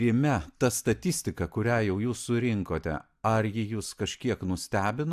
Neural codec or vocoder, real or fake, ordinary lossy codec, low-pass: none; real; AAC, 96 kbps; 14.4 kHz